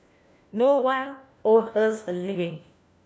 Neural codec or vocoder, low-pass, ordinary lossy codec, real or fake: codec, 16 kHz, 1 kbps, FunCodec, trained on LibriTTS, 50 frames a second; none; none; fake